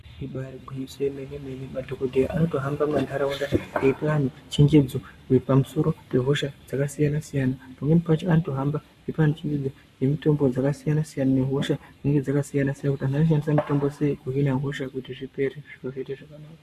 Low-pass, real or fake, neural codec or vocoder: 14.4 kHz; fake; codec, 44.1 kHz, 7.8 kbps, Pupu-Codec